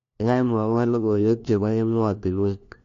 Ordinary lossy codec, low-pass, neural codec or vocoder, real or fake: AAC, 48 kbps; 7.2 kHz; codec, 16 kHz, 1 kbps, FunCodec, trained on LibriTTS, 50 frames a second; fake